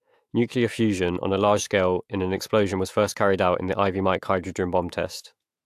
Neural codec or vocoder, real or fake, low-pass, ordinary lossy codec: autoencoder, 48 kHz, 128 numbers a frame, DAC-VAE, trained on Japanese speech; fake; 14.4 kHz; AAC, 64 kbps